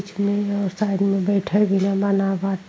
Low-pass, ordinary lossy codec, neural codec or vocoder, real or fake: none; none; none; real